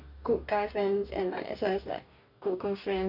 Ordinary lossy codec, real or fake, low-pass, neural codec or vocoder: none; fake; 5.4 kHz; codec, 44.1 kHz, 2.6 kbps, DAC